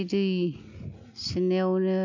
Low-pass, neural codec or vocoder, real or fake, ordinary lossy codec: 7.2 kHz; none; real; MP3, 64 kbps